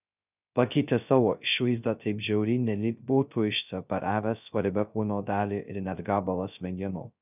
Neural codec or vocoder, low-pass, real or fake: codec, 16 kHz, 0.2 kbps, FocalCodec; 3.6 kHz; fake